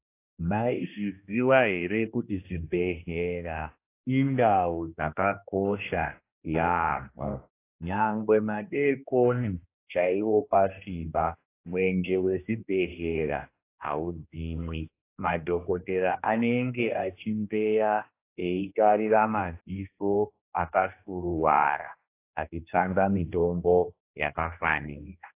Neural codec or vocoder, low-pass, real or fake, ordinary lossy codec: codec, 16 kHz, 1 kbps, X-Codec, HuBERT features, trained on general audio; 3.6 kHz; fake; AAC, 24 kbps